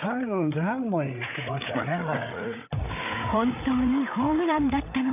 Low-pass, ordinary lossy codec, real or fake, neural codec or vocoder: 3.6 kHz; none; fake; codec, 16 kHz, 8 kbps, FreqCodec, larger model